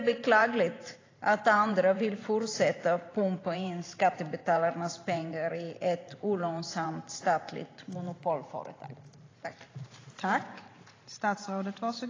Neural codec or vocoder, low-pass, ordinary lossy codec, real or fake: vocoder, 44.1 kHz, 128 mel bands every 512 samples, BigVGAN v2; 7.2 kHz; AAC, 32 kbps; fake